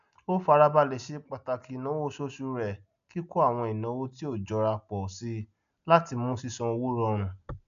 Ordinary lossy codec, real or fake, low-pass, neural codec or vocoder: none; real; 7.2 kHz; none